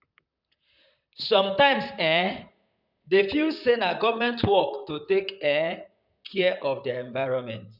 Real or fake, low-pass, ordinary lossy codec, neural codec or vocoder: fake; 5.4 kHz; none; vocoder, 44.1 kHz, 128 mel bands, Pupu-Vocoder